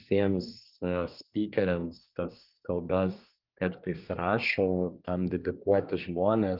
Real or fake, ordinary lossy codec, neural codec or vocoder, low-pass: fake; Opus, 24 kbps; codec, 24 kHz, 1 kbps, SNAC; 5.4 kHz